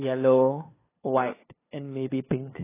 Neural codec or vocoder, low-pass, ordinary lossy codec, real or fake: codec, 16 kHz, 2 kbps, FunCodec, trained on LibriTTS, 25 frames a second; 3.6 kHz; AAC, 16 kbps; fake